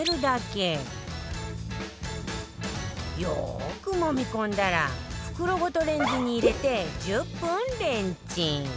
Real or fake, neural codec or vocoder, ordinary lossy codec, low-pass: real; none; none; none